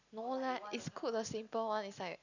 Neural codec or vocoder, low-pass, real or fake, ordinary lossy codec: none; 7.2 kHz; real; none